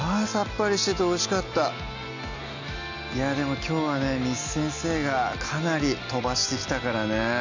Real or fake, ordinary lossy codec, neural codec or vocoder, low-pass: real; none; none; 7.2 kHz